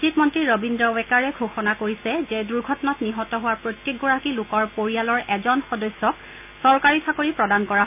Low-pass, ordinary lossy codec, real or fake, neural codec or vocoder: 3.6 kHz; none; real; none